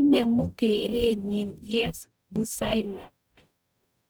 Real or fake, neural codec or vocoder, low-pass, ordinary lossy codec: fake; codec, 44.1 kHz, 0.9 kbps, DAC; none; none